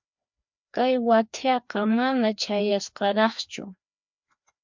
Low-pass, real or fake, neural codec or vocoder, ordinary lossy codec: 7.2 kHz; fake; codec, 16 kHz, 2 kbps, FreqCodec, larger model; MP3, 64 kbps